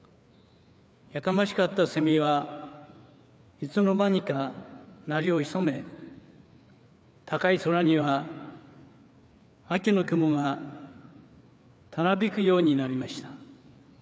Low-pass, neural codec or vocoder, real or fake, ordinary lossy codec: none; codec, 16 kHz, 4 kbps, FreqCodec, larger model; fake; none